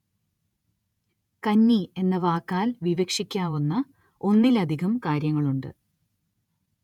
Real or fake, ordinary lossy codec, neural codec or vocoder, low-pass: fake; none; vocoder, 48 kHz, 128 mel bands, Vocos; 19.8 kHz